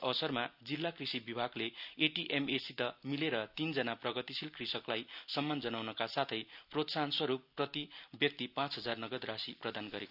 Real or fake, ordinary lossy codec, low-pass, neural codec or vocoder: real; none; 5.4 kHz; none